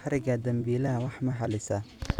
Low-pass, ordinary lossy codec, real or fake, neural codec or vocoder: 19.8 kHz; none; fake; vocoder, 44.1 kHz, 128 mel bands every 256 samples, BigVGAN v2